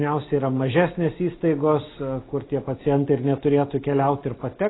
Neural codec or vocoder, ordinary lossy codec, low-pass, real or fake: none; AAC, 16 kbps; 7.2 kHz; real